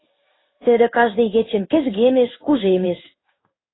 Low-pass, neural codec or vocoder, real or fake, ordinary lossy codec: 7.2 kHz; codec, 16 kHz in and 24 kHz out, 1 kbps, XY-Tokenizer; fake; AAC, 16 kbps